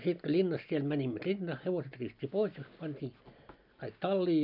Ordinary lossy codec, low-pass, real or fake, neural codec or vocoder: none; 5.4 kHz; real; none